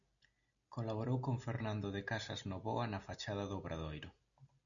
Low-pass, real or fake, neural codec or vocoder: 7.2 kHz; real; none